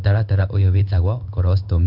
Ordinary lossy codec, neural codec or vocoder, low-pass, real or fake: none; codec, 16 kHz in and 24 kHz out, 1 kbps, XY-Tokenizer; 5.4 kHz; fake